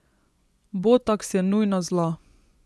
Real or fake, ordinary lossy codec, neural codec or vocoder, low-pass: fake; none; vocoder, 24 kHz, 100 mel bands, Vocos; none